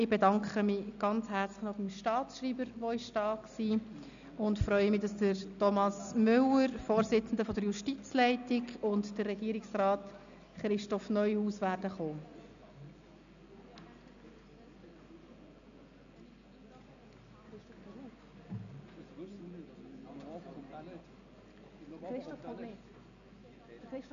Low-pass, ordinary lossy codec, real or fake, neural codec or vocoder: 7.2 kHz; AAC, 96 kbps; real; none